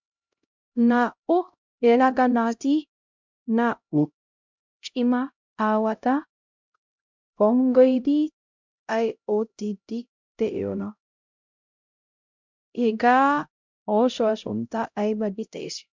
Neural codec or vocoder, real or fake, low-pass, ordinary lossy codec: codec, 16 kHz, 0.5 kbps, X-Codec, HuBERT features, trained on LibriSpeech; fake; 7.2 kHz; MP3, 64 kbps